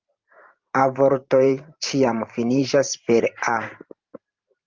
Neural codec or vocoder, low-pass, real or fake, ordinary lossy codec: vocoder, 44.1 kHz, 128 mel bands every 512 samples, BigVGAN v2; 7.2 kHz; fake; Opus, 32 kbps